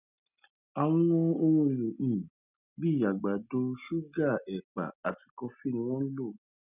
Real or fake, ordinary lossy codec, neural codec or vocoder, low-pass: real; none; none; 3.6 kHz